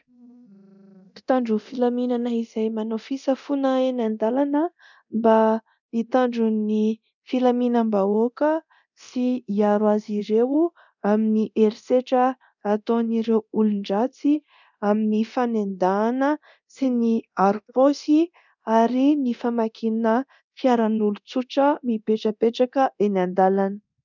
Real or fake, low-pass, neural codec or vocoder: fake; 7.2 kHz; codec, 24 kHz, 0.9 kbps, DualCodec